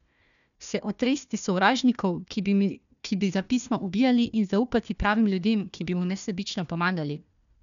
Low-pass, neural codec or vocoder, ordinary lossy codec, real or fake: 7.2 kHz; codec, 16 kHz, 1 kbps, FunCodec, trained on Chinese and English, 50 frames a second; none; fake